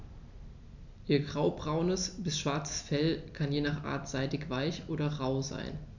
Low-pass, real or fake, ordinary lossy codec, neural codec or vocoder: 7.2 kHz; real; none; none